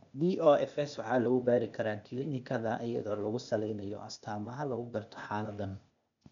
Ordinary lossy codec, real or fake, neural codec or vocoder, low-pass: none; fake; codec, 16 kHz, 0.8 kbps, ZipCodec; 7.2 kHz